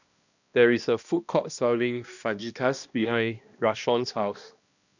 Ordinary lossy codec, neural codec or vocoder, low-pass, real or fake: none; codec, 16 kHz, 1 kbps, X-Codec, HuBERT features, trained on balanced general audio; 7.2 kHz; fake